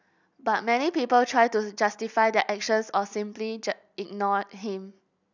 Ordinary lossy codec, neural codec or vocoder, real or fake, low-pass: none; none; real; 7.2 kHz